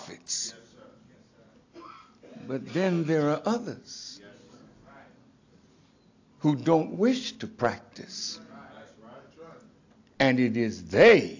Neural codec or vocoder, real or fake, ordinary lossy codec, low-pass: none; real; AAC, 48 kbps; 7.2 kHz